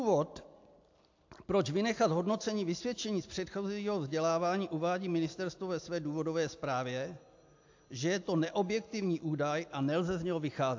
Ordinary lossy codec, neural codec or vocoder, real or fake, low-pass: AAC, 48 kbps; none; real; 7.2 kHz